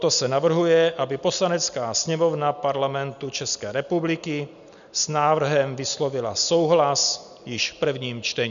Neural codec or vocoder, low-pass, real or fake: none; 7.2 kHz; real